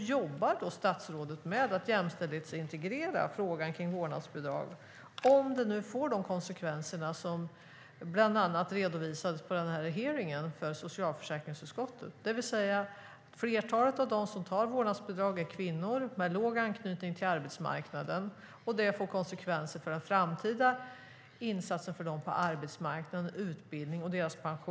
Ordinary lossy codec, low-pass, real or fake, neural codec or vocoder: none; none; real; none